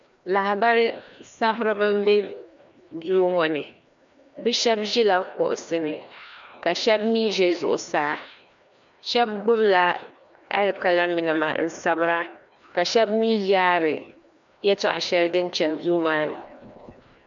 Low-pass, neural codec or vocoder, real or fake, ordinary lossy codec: 7.2 kHz; codec, 16 kHz, 1 kbps, FreqCodec, larger model; fake; MP3, 64 kbps